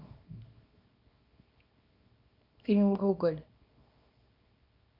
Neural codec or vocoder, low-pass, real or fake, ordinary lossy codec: codec, 24 kHz, 0.9 kbps, WavTokenizer, small release; 5.4 kHz; fake; none